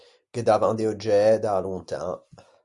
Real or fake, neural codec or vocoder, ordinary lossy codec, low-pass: real; none; Opus, 64 kbps; 10.8 kHz